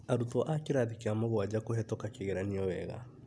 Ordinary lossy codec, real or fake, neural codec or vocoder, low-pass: none; real; none; none